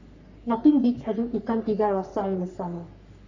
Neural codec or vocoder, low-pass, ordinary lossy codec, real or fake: codec, 44.1 kHz, 3.4 kbps, Pupu-Codec; 7.2 kHz; none; fake